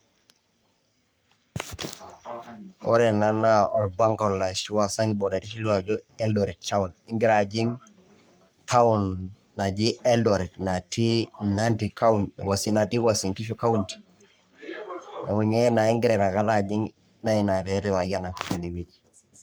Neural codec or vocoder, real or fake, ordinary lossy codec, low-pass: codec, 44.1 kHz, 3.4 kbps, Pupu-Codec; fake; none; none